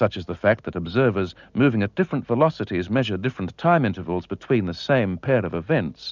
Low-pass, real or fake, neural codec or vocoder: 7.2 kHz; real; none